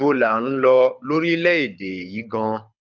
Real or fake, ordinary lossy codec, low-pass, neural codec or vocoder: fake; none; 7.2 kHz; codec, 24 kHz, 6 kbps, HILCodec